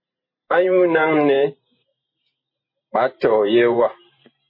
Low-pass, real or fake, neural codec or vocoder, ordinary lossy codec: 5.4 kHz; fake; vocoder, 44.1 kHz, 128 mel bands every 512 samples, BigVGAN v2; MP3, 24 kbps